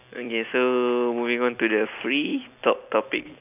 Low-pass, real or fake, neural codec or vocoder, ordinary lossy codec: 3.6 kHz; real; none; none